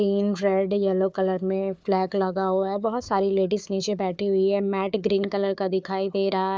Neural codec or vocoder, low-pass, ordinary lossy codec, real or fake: codec, 16 kHz, 4 kbps, FunCodec, trained on Chinese and English, 50 frames a second; none; none; fake